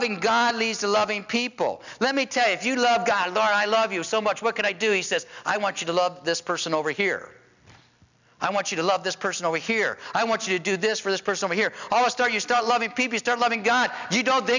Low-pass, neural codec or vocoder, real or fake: 7.2 kHz; none; real